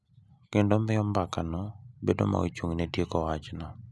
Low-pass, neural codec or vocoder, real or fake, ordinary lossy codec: none; none; real; none